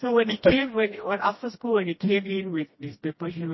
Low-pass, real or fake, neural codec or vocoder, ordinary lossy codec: 7.2 kHz; fake; codec, 16 kHz, 1 kbps, FreqCodec, smaller model; MP3, 24 kbps